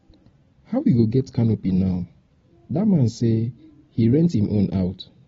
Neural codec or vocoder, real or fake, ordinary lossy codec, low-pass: none; real; AAC, 24 kbps; 7.2 kHz